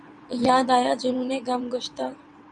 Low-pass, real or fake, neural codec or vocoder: 9.9 kHz; fake; vocoder, 22.05 kHz, 80 mel bands, WaveNeXt